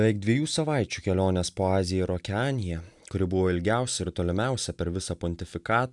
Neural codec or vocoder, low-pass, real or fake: none; 10.8 kHz; real